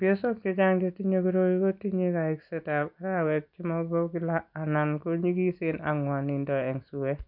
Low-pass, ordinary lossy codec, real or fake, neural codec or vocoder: 5.4 kHz; none; real; none